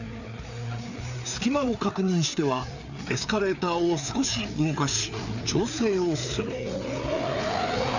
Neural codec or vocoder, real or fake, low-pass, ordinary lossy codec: codec, 16 kHz, 4 kbps, FreqCodec, larger model; fake; 7.2 kHz; none